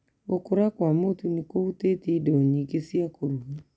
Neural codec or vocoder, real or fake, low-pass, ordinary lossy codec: none; real; none; none